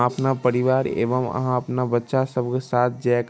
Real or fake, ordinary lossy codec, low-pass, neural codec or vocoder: real; none; none; none